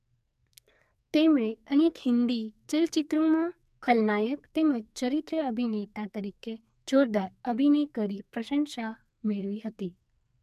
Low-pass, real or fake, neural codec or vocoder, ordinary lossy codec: 14.4 kHz; fake; codec, 44.1 kHz, 2.6 kbps, SNAC; none